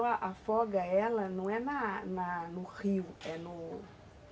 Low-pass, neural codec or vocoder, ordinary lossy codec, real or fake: none; none; none; real